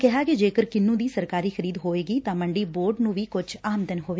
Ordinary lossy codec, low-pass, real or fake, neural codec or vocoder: none; none; real; none